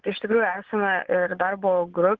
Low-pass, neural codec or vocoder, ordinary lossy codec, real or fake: 7.2 kHz; vocoder, 24 kHz, 100 mel bands, Vocos; Opus, 16 kbps; fake